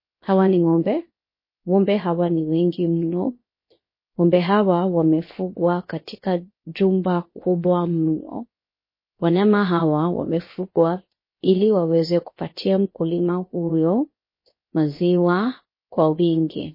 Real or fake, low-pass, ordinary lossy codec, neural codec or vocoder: fake; 5.4 kHz; MP3, 24 kbps; codec, 16 kHz, 0.7 kbps, FocalCodec